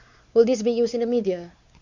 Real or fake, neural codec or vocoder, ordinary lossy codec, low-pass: fake; codec, 16 kHz, 4 kbps, X-Codec, WavLM features, trained on Multilingual LibriSpeech; Opus, 64 kbps; 7.2 kHz